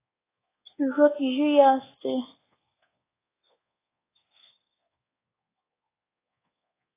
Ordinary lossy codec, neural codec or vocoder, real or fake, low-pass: AAC, 16 kbps; codec, 24 kHz, 1.2 kbps, DualCodec; fake; 3.6 kHz